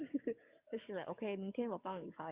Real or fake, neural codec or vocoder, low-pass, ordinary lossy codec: fake; codec, 44.1 kHz, 7.8 kbps, DAC; 3.6 kHz; Opus, 64 kbps